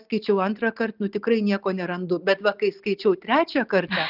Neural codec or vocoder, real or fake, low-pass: none; real; 5.4 kHz